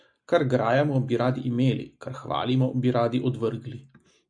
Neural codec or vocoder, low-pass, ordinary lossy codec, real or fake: none; 9.9 kHz; MP3, 64 kbps; real